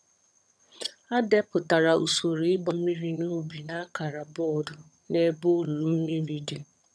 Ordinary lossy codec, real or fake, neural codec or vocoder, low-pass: none; fake; vocoder, 22.05 kHz, 80 mel bands, HiFi-GAN; none